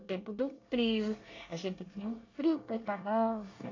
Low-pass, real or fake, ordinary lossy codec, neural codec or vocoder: 7.2 kHz; fake; AAC, 32 kbps; codec, 24 kHz, 1 kbps, SNAC